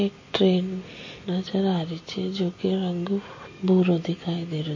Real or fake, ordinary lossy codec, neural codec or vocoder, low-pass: real; MP3, 32 kbps; none; 7.2 kHz